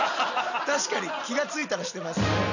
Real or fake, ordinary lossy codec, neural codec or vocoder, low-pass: real; none; none; 7.2 kHz